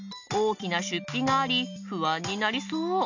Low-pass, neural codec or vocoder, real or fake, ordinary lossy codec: 7.2 kHz; none; real; none